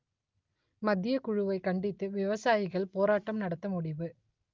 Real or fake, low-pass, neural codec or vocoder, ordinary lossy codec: real; 7.2 kHz; none; Opus, 24 kbps